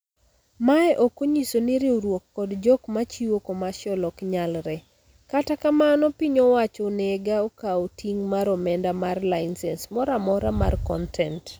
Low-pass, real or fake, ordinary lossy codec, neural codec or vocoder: none; real; none; none